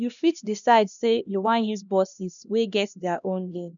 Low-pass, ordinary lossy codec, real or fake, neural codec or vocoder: 7.2 kHz; none; fake; codec, 16 kHz, 1 kbps, X-Codec, HuBERT features, trained on LibriSpeech